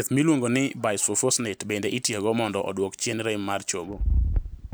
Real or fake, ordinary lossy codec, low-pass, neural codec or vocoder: real; none; none; none